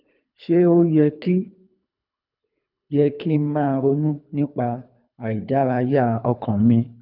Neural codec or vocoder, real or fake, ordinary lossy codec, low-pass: codec, 24 kHz, 3 kbps, HILCodec; fake; none; 5.4 kHz